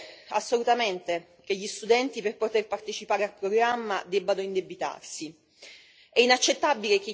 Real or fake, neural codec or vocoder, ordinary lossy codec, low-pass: real; none; none; none